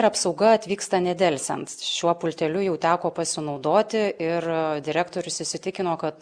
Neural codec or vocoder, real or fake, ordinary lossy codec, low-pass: none; real; Opus, 64 kbps; 9.9 kHz